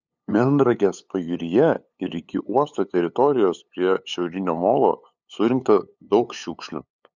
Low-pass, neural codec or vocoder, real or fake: 7.2 kHz; codec, 16 kHz, 8 kbps, FunCodec, trained on LibriTTS, 25 frames a second; fake